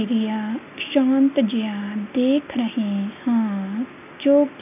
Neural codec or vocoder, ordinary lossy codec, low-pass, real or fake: none; none; 3.6 kHz; real